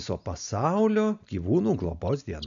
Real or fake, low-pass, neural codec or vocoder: real; 7.2 kHz; none